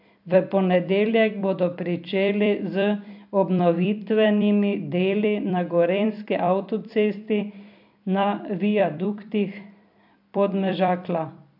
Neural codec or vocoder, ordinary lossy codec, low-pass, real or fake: vocoder, 44.1 kHz, 128 mel bands every 256 samples, BigVGAN v2; AAC, 48 kbps; 5.4 kHz; fake